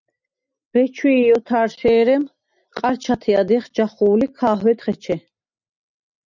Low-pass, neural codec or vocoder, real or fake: 7.2 kHz; none; real